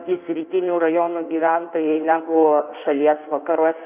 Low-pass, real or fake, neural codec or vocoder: 3.6 kHz; fake; codec, 16 kHz in and 24 kHz out, 1.1 kbps, FireRedTTS-2 codec